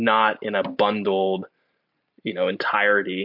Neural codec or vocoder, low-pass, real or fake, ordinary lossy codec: none; 5.4 kHz; real; MP3, 48 kbps